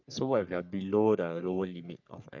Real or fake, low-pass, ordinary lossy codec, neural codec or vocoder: fake; 7.2 kHz; none; codec, 44.1 kHz, 3.4 kbps, Pupu-Codec